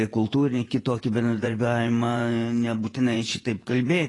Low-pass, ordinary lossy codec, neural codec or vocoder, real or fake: 10.8 kHz; AAC, 32 kbps; vocoder, 24 kHz, 100 mel bands, Vocos; fake